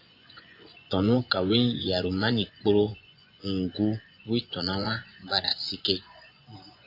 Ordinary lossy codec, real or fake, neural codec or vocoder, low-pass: AAC, 32 kbps; fake; vocoder, 24 kHz, 100 mel bands, Vocos; 5.4 kHz